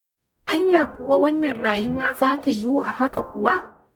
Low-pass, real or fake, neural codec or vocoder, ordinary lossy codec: 19.8 kHz; fake; codec, 44.1 kHz, 0.9 kbps, DAC; none